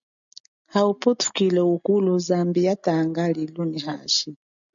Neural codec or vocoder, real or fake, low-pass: none; real; 7.2 kHz